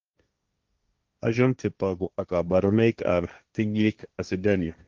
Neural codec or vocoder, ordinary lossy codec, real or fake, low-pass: codec, 16 kHz, 1.1 kbps, Voila-Tokenizer; Opus, 32 kbps; fake; 7.2 kHz